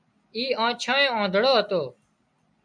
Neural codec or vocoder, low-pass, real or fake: none; 9.9 kHz; real